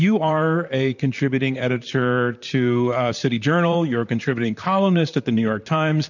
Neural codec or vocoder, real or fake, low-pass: vocoder, 44.1 kHz, 128 mel bands, Pupu-Vocoder; fake; 7.2 kHz